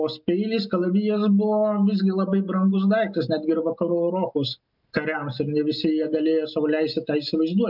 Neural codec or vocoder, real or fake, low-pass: none; real; 5.4 kHz